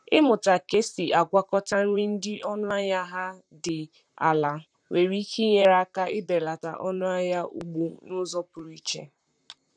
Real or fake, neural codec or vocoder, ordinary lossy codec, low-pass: fake; vocoder, 44.1 kHz, 128 mel bands, Pupu-Vocoder; none; 9.9 kHz